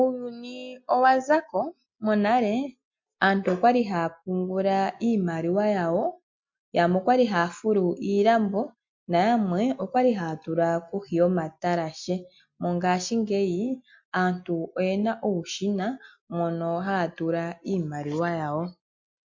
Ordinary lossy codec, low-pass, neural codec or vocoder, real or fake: MP3, 48 kbps; 7.2 kHz; none; real